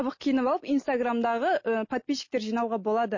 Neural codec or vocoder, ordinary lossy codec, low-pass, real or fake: none; MP3, 32 kbps; 7.2 kHz; real